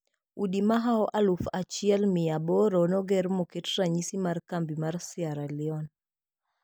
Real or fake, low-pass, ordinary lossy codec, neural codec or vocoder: real; none; none; none